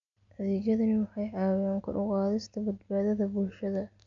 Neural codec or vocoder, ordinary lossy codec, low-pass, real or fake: none; MP3, 64 kbps; 7.2 kHz; real